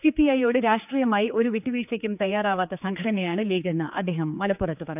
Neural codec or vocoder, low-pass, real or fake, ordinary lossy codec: codec, 16 kHz, 4 kbps, X-Codec, HuBERT features, trained on general audio; 3.6 kHz; fake; none